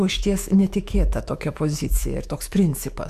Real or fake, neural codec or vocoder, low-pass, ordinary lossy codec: fake; autoencoder, 48 kHz, 128 numbers a frame, DAC-VAE, trained on Japanese speech; 14.4 kHz; Opus, 64 kbps